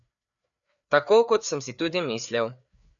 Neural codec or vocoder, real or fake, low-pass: codec, 16 kHz, 4 kbps, FreqCodec, larger model; fake; 7.2 kHz